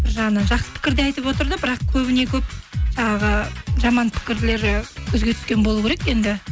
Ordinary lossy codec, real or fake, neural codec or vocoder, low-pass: none; real; none; none